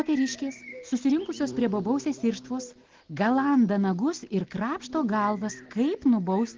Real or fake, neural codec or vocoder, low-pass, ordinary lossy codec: real; none; 7.2 kHz; Opus, 16 kbps